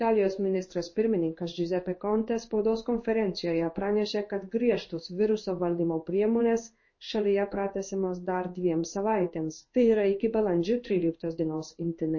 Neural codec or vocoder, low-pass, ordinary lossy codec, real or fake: codec, 16 kHz in and 24 kHz out, 1 kbps, XY-Tokenizer; 7.2 kHz; MP3, 32 kbps; fake